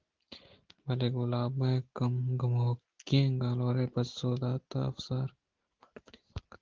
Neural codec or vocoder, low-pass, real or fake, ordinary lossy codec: none; 7.2 kHz; real; Opus, 16 kbps